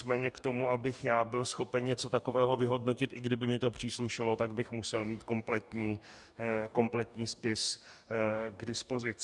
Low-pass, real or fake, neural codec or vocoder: 10.8 kHz; fake; codec, 44.1 kHz, 2.6 kbps, DAC